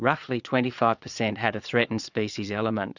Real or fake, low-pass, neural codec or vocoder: fake; 7.2 kHz; vocoder, 22.05 kHz, 80 mel bands, Vocos